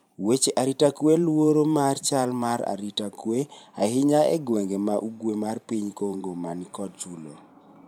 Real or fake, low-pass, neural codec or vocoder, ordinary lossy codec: fake; 19.8 kHz; vocoder, 44.1 kHz, 128 mel bands every 512 samples, BigVGAN v2; MP3, 96 kbps